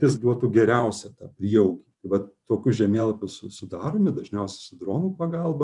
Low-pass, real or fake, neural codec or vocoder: 10.8 kHz; fake; vocoder, 24 kHz, 100 mel bands, Vocos